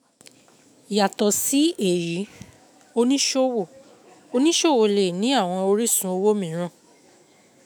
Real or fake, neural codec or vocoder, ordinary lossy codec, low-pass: fake; autoencoder, 48 kHz, 128 numbers a frame, DAC-VAE, trained on Japanese speech; none; none